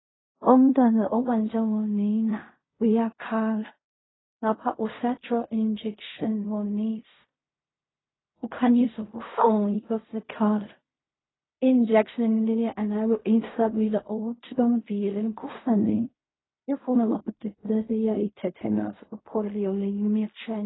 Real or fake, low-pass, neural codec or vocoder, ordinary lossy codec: fake; 7.2 kHz; codec, 16 kHz in and 24 kHz out, 0.4 kbps, LongCat-Audio-Codec, fine tuned four codebook decoder; AAC, 16 kbps